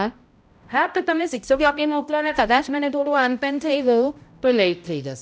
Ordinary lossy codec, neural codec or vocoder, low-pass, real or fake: none; codec, 16 kHz, 0.5 kbps, X-Codec, HuBERT features, trained on balanced general audio; none; fake